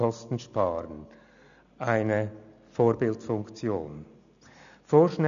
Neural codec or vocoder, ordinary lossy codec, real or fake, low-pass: none; none; real; 7.2 kHz